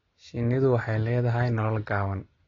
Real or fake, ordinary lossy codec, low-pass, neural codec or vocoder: real; AAC, 32 kbps; 7.2 kHz; none